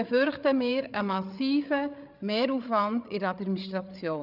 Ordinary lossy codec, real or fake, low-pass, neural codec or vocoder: none; fake; 5.4 kHz; codec, 16 kHz, 8 kbps, FreqCodec, larger model